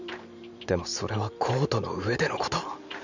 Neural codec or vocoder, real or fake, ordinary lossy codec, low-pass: vocoder, 44.1 kHz, 128 mel bands every 512 samples, BigVGAN v2; fake; none; 7.2 kHz